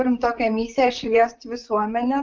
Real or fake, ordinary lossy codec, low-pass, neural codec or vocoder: fake; Opus, 24 kbps; 7.2 kHz; vocoder, 44.1 kHz, 128 mel bands, Pupu-Vocoder